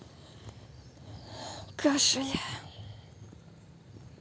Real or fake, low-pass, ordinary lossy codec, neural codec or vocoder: real; none; none; none